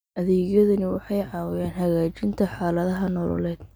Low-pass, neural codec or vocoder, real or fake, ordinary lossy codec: none; none; real; none